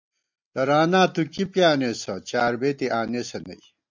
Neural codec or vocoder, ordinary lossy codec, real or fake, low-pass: none; MP3, 64 kbps; real; 7.2 kHz